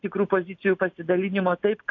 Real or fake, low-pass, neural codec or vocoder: real; 7.2 kHz; none